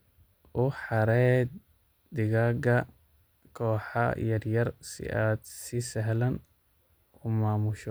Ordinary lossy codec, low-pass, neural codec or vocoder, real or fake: none; none; none; real